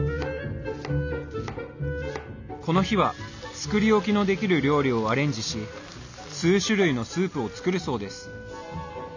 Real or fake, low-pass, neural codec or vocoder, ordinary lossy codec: real; 7.2 kHz; none; none